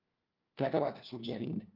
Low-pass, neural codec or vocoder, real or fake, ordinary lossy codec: 5.4 kHz; codec, 16 kHz, 1 kbps, FunCodec, trained on LibriTTS, 50 frames a second; fake; Opus, 32 kbps